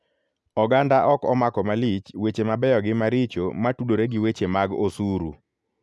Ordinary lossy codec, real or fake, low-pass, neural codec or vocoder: none; real; none; none